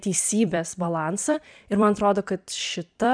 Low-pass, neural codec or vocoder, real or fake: 9.9 kHz; vocoder, 44.1 kHz, 128 mel bands every 256 samples, BigVGAN v2; fake